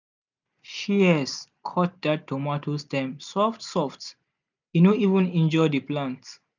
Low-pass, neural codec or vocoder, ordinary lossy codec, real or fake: 7.2 kHz; none; none; real